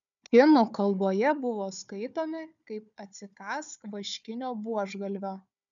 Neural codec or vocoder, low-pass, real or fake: codec, 16 kHz, 4 kbps, FunCodec, trained on Chinese and English, 50 frames a second; 7.2 kHz; fake